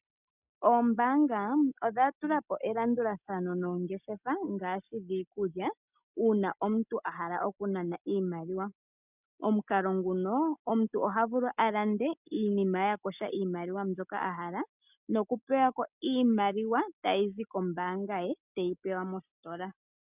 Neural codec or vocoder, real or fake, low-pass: none; real; 3.6 kHz